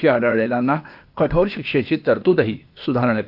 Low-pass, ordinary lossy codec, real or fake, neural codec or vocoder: 5.4 kHz; AAC, 48 kbps; fake; codec, 16 kHz, 0.8 kbps, ZipCodec